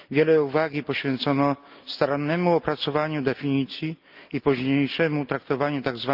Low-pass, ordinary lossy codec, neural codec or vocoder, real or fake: 5.4 kHz; Opus, 24 kbps; none; real